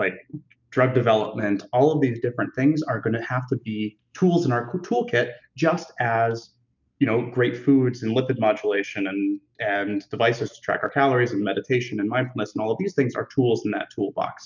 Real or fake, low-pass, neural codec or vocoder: real; 7.2 kHz; none